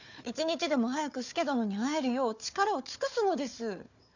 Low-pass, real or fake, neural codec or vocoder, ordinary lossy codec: 7.2 kHz; fake; codec, 16 kHz, 4 kbps, FunCodec, trained on Chinese and English, 50 frames a second; none